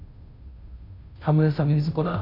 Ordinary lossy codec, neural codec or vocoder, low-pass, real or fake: none; codec, 16 kHz, 0.5 kbps, FunCodec, trained on Chinese and English, 25 frames a second; 5.4 kHz; fake